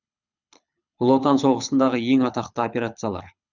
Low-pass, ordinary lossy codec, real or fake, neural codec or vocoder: 7.2 kHz; none; fake; codec, 24 kHz, 6 kbps, HILCodec